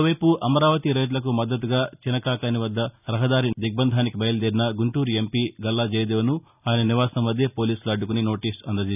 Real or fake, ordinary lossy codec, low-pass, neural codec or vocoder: real; none; 3.6 kHz; none